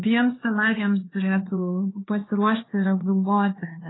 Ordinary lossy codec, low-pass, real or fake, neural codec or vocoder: AAC, 16 kbps; 7.2 kHz; fake; codec, 16 kHz, 2 kbps, X-Codec, HuBERT features, trained on LibriSpeech